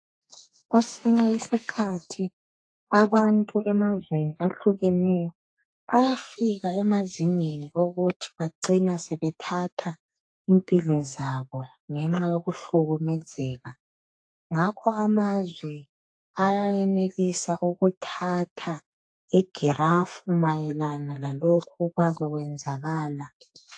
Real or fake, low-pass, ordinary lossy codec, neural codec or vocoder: fake; 9.9 kHz; AAC, 48 kbps; codec, 32 kHz, 1.9 kbps, SNAC